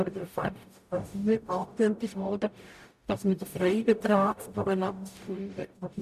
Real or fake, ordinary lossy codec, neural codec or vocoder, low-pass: fake; none; codec, 44.1 kHz, 0.9 kbps, DAC; 14.4 kHz